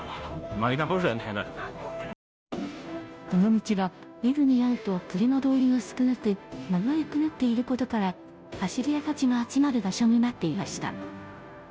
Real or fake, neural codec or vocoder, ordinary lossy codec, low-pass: fake; codec, 16 kHz, 0.5 kbps, FunCodec, trained on Chinese and English, 25 frames a second; none; none